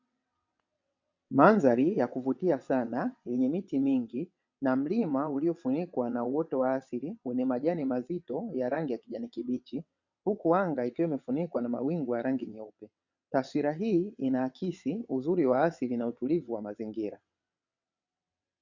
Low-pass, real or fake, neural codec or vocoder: 7.2 kHz; fake; vocoder, 22.05 kHz, 80 mel bands, WaveNeXt